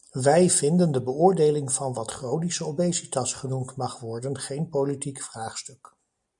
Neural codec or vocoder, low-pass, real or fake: none; 10.8 kHz; real